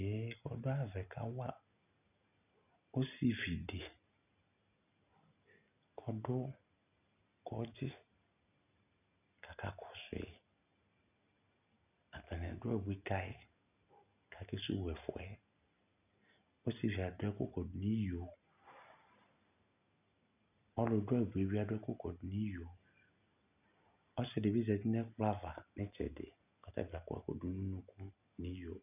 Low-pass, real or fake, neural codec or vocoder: 3.6 kHz; real; none